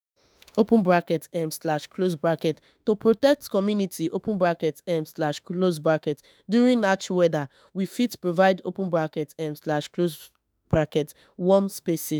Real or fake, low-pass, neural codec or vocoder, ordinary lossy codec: fake; none; autoencoder, 48 kHz, 32 numbers a frame, DAC-VAE, trained on Japanese speech; none